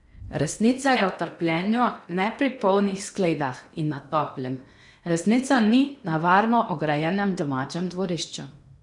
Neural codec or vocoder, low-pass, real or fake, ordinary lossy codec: codec, 16 kHz in and 24 kHz out, 0.8 kbps, FocalCodec, streaming, 65536 codes; 10.8 kHz; fake; MP3, 96 kbps